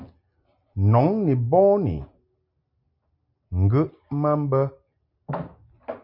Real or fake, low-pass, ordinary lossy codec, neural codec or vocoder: real; 5.4 kHz; MP3, 32 kbps; none